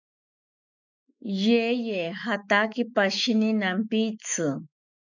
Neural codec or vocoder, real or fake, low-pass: autoencoder, 48 kHz, 128 numbers a frame, DAC-VAE, trained on Japanese speech; fake; 7.2 kHz